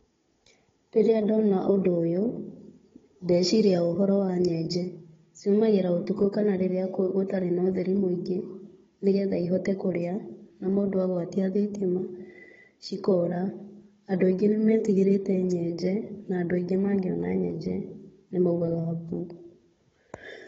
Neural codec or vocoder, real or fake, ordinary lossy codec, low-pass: codec, 16 kHz, 16 kbps, FunCodec, trained on Chinese and English, 50 frames a second; fake; AAC, 24 kbps; 7.2 kHz